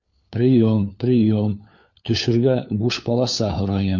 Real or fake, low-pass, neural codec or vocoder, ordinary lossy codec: fake; 7.2 kHz; codec, 16 kHz, 4 kbps, FunCodec, trained on LibriTTS, 50 frames a second; MP3, 48 kbps